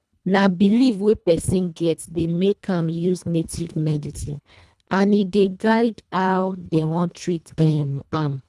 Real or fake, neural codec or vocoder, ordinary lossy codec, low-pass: fake; codec, 24 kHz, 1.5 kbps, HILCodec; none; none